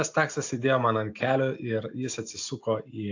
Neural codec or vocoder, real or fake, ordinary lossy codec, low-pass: none; real; AAC, 48 kbps; 7.2 kHz